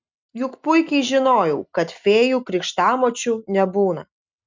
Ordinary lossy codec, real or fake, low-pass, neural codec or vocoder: MP3, 64 kbps; real; 7.2 kHz; none